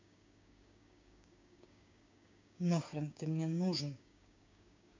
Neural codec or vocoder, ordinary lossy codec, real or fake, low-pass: codec, 16 kHz, 6 kbps, DAC; MP3, 64 kbps; fake; 7.2 kHz